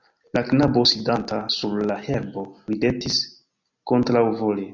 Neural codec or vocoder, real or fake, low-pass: none; real; 7.2 kHz